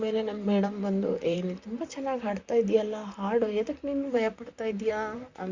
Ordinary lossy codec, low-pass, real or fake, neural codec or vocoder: Opus, 64 kbps; 7.2 kHz; fake; vocoder, 44.1 kHz, 128 mel bands, Pupu-Vocoder